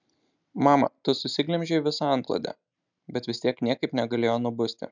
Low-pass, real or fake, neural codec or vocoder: 7.2 kHz; real; none